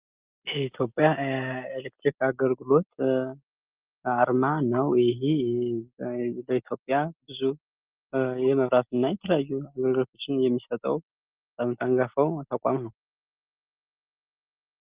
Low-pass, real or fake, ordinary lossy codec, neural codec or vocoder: 3.6 kHz; real; Opus, 24 kbps; none